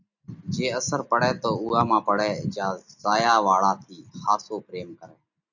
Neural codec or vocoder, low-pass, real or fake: none; 7.2 kHz; real